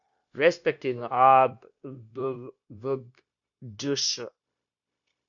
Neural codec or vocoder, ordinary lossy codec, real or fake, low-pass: codec, 16 kHz, 0.9 kbps, LongCat-Audio-Codec; AAC, 64 kbps; fake; 7.2 kHz